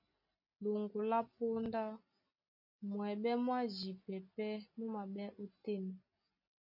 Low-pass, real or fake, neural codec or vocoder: 5.4 kHz; real; none